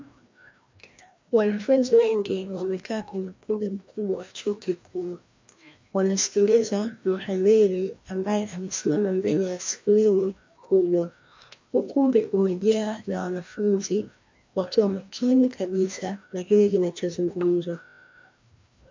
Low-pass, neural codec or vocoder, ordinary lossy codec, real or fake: 7.2 kHz; codec, 16 kHz, 1 kbps, FreqCodec, larger model; MP3, 64 kbps; fake